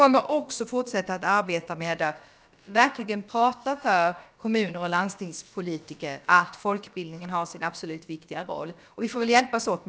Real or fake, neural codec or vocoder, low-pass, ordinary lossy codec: fake; codec, 16 kHz, about 1 kbps, DyCAST, with the encoder's durations; none; none